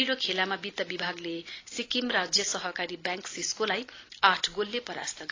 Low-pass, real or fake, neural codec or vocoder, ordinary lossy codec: 7.2 kHz; real; none; AAC, 32 kbps